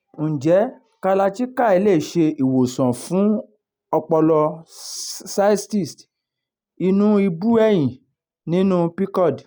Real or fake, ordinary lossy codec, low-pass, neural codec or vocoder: real; none; none; none